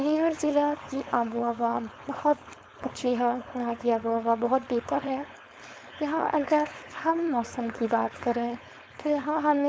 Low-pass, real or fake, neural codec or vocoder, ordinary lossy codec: none; fake; codec, 16 kHz, 4.8 kbps, FACodec; none